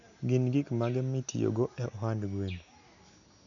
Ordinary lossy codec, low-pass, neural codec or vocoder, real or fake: none; 7.2 kHz; none; real